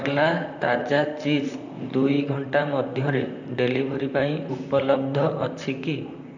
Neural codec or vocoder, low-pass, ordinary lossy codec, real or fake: vocoder, 44.1 kHz, 128 mel bands, Pupu-Vocoder; 7.2 kHz; none; fake